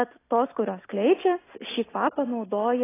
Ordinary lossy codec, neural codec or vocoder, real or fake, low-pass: AAC, 16 kbps; none; real; 3.6 kHz